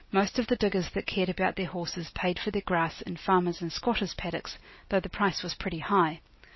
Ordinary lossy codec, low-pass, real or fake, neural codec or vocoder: MP3, 24 kbps; 7.2 kHz; real; none